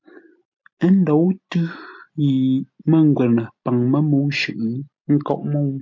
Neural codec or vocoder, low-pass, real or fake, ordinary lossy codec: none; 7.2 kHz; real; MP3, 48 kbps